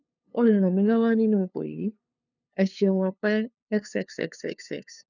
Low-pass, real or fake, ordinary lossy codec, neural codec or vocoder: 7.2 kHz; fake; none; codec, 16 kHz, 2 kbps, FunCodec, trained on LibriTTS, 25 frames a second